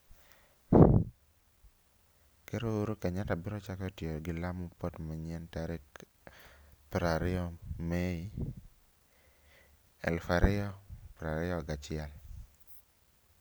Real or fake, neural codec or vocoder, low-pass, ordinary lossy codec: real; none; none; none